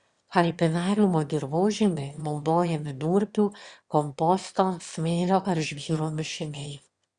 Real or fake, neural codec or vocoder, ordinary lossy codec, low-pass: fake; autoencoder, 22.05 kHz, a latent of 192 numbers a frame, VITS, trained on one speaker; Opus, 64 kbps; 9.9 kHz